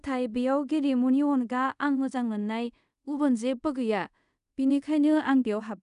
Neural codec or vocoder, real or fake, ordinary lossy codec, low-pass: codec, 24 kHz, 0.5 kbps, DualCodec; fake; none; 10.8 kHz